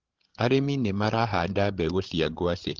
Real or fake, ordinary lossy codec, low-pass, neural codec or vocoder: fake; Opus, 16 kbps; 7.2 kHz; codec, 16 kHz, 16 kbps, FreqCodec, larger model